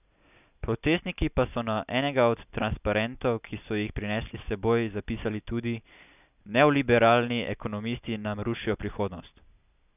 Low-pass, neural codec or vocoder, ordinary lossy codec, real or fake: 3.6 kHz; none; none; real